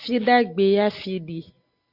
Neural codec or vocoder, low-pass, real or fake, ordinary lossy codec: none; 5.4 kHz; real; Opus, 64 kbps